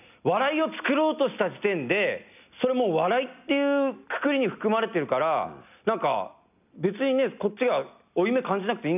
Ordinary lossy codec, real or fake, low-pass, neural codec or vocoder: none; real; 3.6 kHz; none